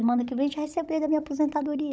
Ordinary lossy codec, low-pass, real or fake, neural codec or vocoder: none; none; fake; codec, 16 kHz, 16 kbps, FunCodec, trained on Chinese and English, 50 frames a second